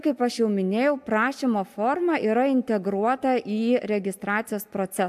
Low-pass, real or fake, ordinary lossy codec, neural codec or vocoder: 14.4 kHz; real; AAC, 96 kbps; none